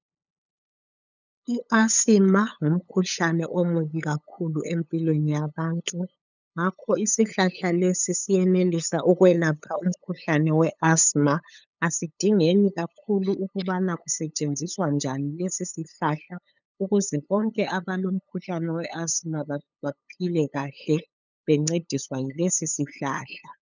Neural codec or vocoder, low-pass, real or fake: codec, 16 kHz, 8 kbps, FunCodec, trained on LibriTTS, 25 frames a second; 7.2 kHz; fake